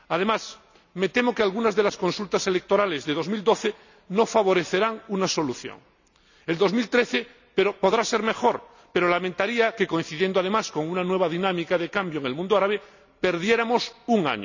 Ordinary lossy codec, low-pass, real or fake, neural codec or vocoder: none; 7.2 kHz; real; none